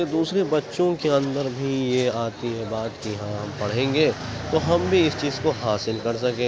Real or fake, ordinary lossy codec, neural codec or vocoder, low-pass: real; none; none; none